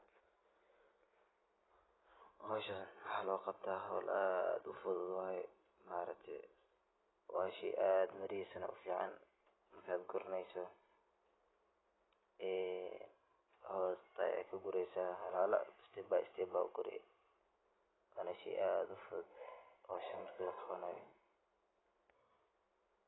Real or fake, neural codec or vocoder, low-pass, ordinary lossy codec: real; none; 7.2 kHz; AAC, 16 kbps